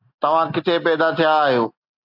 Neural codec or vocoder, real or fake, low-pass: none; real; 5.4 kHz